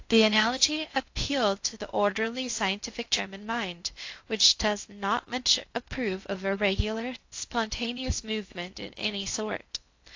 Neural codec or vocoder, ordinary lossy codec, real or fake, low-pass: codec, 16 kHz in and 24 kHz out, 0.6 kbps, FocalCodec, streaming, 2048 codes; AAC, 48 kbps; fake; 7.2 kHz